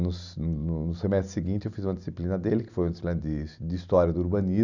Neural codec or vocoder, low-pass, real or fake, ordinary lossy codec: none; 7.2 kHz; real; none